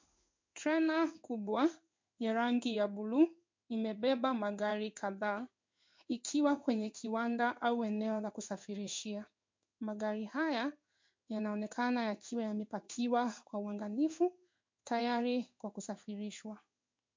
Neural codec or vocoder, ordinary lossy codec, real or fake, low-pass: codec, 16 kHz in and 24 kHz out, 1 kbps, XY-Tokenizer; MP3, 48 kbps; fake; 7.2 kHz